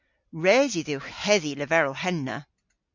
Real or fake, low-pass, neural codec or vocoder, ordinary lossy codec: real; 7.2 kHz; none; MP3, 64 kbps